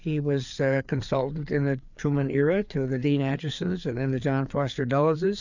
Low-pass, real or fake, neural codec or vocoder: 7.2 kHz; fake; codec, 16 kHz, 4 kbps, FreqCodec, larger model